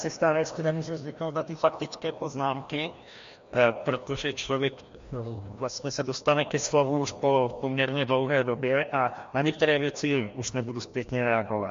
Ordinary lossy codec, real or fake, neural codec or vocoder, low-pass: MP3, 48 kbps; fake; codec, 16 kHz, 1 kbps, FreqCodec, larger model; 7.2 kHz